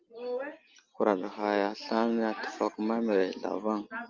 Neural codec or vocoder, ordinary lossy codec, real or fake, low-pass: none; Opus, 24 kbps; real; 7.2 kHz